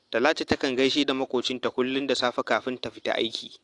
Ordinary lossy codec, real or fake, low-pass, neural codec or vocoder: AAC, 48 kbps; real; 10.8 kHz; none